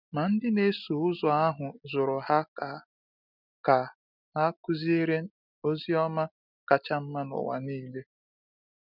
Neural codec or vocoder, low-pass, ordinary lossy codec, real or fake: none; 5.4 kHz; none; real